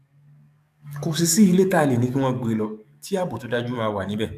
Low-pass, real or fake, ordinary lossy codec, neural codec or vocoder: 14.4 kHz; fake; none; codec, 44.1 kHz, 7.8 kbps, DAC